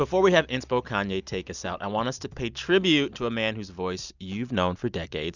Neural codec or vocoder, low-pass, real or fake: none; 7.2 kHz; real